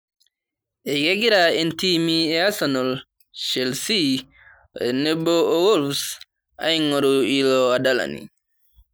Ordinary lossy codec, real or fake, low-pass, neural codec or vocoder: none; real; none; none